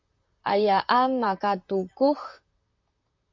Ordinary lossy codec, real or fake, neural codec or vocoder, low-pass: MP3, 64 kbps; fake; vocoder, 44.1 kHz, 128 mel bands, Pupu-Vocoder; 7.2 kHz